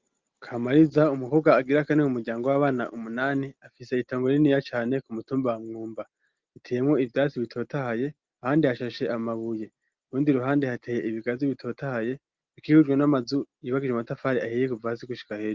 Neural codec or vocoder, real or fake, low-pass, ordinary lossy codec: none; real; 7.2 kHz; Opus, 32 kbps